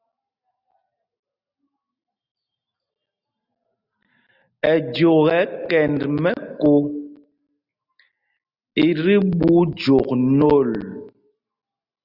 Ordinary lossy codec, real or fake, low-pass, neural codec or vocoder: Opus, 64 kbps; real; 5.4 kHz; none